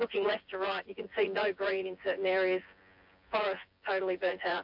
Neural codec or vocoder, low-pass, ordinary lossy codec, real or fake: vocoder, 24 kHz, 100 mel bands, Vocos; 5.4 kHz; AAC, 48 kbps; fake